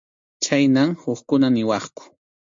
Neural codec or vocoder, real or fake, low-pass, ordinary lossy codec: none; real; 7.2 kHz; MP3, 48 kbps